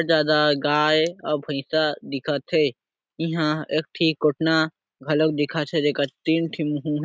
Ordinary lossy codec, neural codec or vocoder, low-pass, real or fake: none; none; none; real